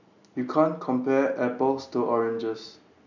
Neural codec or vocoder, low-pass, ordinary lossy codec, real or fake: none; 7.2 kHz; none; real